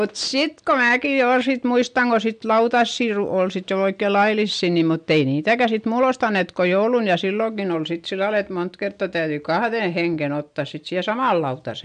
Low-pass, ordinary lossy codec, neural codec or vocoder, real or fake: 9.9 kHz; MP3, 64 kbps; none; real